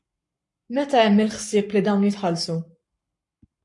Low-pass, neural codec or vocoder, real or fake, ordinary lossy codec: 10.8 kHz; codec, 44.1 kHz, 7.8 kbps, Pupu-Codec; fake; MP3, 64 kbps